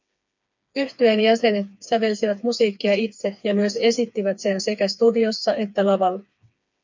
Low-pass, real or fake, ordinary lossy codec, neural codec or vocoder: 7.2 kHz; fake; MP3, 64 kbps; codec, 16 kHz, 4 kbps, FreqCodec, smaller model